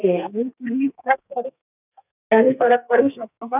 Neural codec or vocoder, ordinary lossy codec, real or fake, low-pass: codec, 32 kHz, 1.9 kbps, SNAC; none; fake; 3.6 kHz